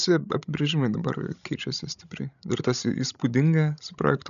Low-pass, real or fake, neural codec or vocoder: 7.2 kHz; fake; codec, 16 kHz, 16 kbps, FreqCodec, larger model